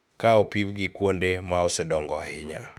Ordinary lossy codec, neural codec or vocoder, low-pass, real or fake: none; autoencoder, 48 kHz, 32 numbers a frame, DAC-VAE, trained on Japanese speech; 19.8 kHz; fake